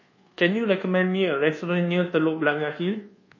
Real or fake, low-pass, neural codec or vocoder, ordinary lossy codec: fake; 7.2 kHz; codec, 24 kHz, 1.2 kbps, DualCodec; MP3, 32 kbps